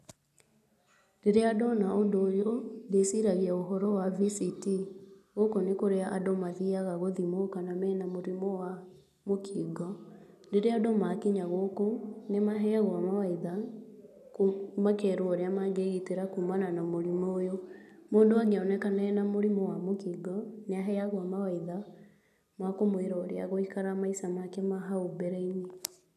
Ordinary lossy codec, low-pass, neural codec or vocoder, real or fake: none; 14.4 kHz; none; real